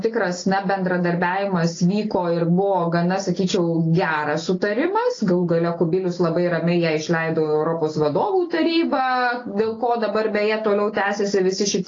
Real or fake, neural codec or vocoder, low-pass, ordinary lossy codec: real; none; 7.2 kHz; AAC, 32 kbps